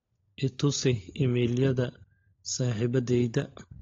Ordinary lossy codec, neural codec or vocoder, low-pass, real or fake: AAC, 32 kbps; codec, 16 kHz, 16 kbps, FunCodec, trained on LibriTTS, 50 frames a second; 7.2 kHz; fake